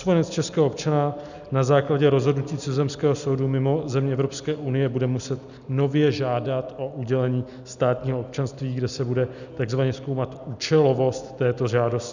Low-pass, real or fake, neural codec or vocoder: 7.2 kHz; real; none